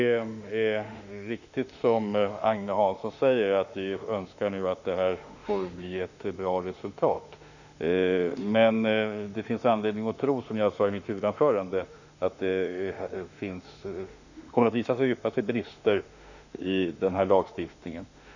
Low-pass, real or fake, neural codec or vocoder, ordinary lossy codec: 7.2 kHz; fake; autoencoder, 48 kHz, 32 numbers a frame, DAC-VAE, trained on Japanese speech; none